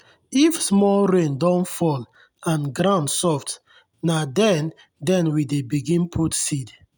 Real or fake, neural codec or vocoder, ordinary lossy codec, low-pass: real; none; none; none